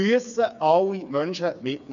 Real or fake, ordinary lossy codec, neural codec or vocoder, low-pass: fake; none; codec, 16 kHz, 4 kbps, FreqCodec, smaller model; 7.2 kHz